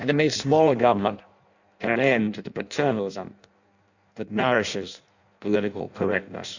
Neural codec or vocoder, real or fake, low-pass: codec, 16 kHz in and 24 kHz out, 0.6 kbps, FireRedTTS-2 codec; fake; 7.2 kHz